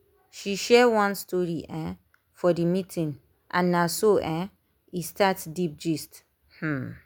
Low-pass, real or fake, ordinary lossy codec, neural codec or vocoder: none; real; none; none